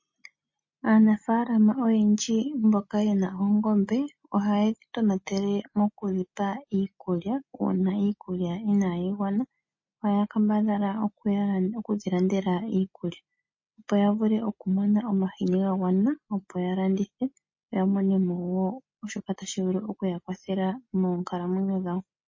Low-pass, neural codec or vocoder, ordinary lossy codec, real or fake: 7.2 kHz; none; MP3, 32 kbps; real